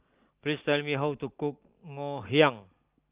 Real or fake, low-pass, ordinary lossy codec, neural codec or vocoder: real; 3.6 kHz; Opus, 24 kbps; none